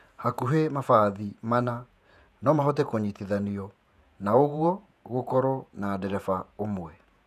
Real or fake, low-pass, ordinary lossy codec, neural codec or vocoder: fake; 14.4 kHz; none; vocoder, 48 kHz, 128 mel bands, Vocos